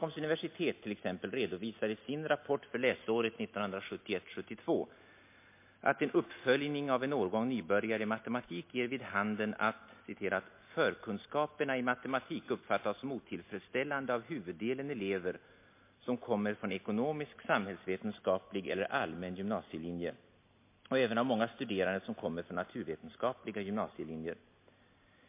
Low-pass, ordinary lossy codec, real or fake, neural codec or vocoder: 3.6 kHz; MP3, 24 kbps; real; none